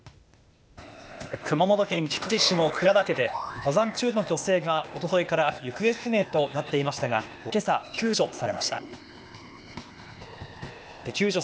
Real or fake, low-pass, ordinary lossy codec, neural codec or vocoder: fake; none; none; codec, 16 kHz, 0.8 kbps, ZipCodec